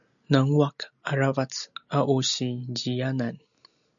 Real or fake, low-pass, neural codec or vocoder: real; 7.2 kHz; none